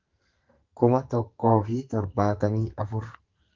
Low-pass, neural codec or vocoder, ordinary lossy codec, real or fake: 7.2 kHz; codec, 44.1 kHz, 2.6 kbps, SNAC; Opus, 24 kbps; fake